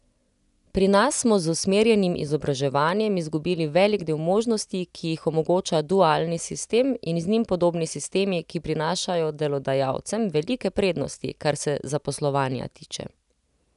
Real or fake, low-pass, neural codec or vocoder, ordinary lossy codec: real; 10.8 kHz; none; none